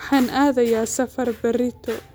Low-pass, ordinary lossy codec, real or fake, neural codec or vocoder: none; none; real; none